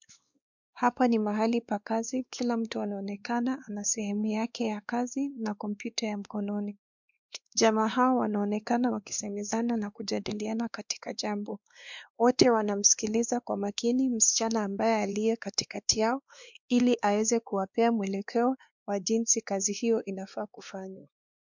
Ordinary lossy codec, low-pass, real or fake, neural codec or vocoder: MP3, 64 kbps; 7.2 kHz; fake; codec, 16 kHz, 2 kbps, X-Codec, WavLM features, trained on Multilingual LibriSpeech